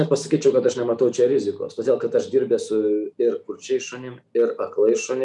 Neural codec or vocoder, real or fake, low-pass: none; real; 10.8 kHz